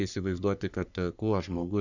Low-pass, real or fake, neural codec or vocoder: 7.2 kHz; fake; codec, 44.1 kHz, 3.4 kbps, Pupu-Codec